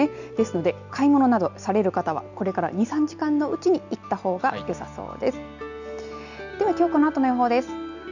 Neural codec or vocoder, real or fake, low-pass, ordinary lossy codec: none; real; 7.2 kHz; none